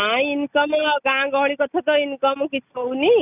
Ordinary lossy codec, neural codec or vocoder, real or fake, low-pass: none; none; real; 3.6 kHz